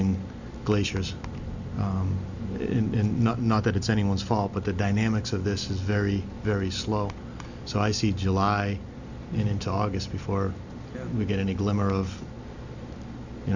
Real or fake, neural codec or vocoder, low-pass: real; none; 7.2 kHz